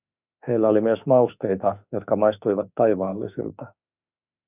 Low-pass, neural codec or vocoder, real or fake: 3.6 kHz; autoencoder, 48 kHz, 32 numbers a frame, DAC-VAE, trained on Japanese speech; fake